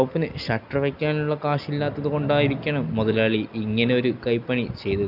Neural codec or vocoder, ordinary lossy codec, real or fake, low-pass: none; none; real; 5.4 kHz